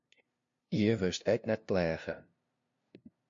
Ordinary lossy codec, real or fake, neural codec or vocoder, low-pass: MP3, 48 kbps; fake; codec, 16 kHz, 0.5 kbps, FunCodec, trained on LibriTTS, 25 frames a second; 7.2 kHz